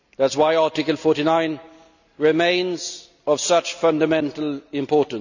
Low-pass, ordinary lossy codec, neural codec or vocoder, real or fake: 7.2 kHz; none; none; real